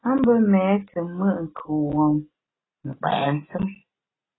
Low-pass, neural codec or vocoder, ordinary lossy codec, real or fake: 7.2 kHz; none; AAC, 16 kbps; real